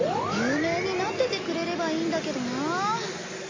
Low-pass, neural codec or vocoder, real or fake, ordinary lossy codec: 7.2 kHz; none; real; MP3, 32 kbps